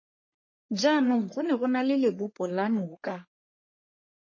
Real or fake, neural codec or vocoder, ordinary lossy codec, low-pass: fake; codec, 44.1 kHz, 3.4 kbps, Pupu-Codec; MP3, 32 kbps; 7.2 kHz